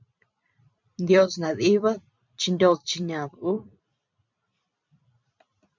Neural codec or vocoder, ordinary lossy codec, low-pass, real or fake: vocoder, 44.1 kHz, 128 mel bands every 256 samples, BigVGAN v2; MP3, 64 kbps; 7.2 kHz; fake